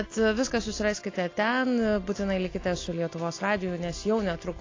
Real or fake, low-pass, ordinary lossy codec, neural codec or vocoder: real; 7.2 kHz; AAC, 32 kbps; none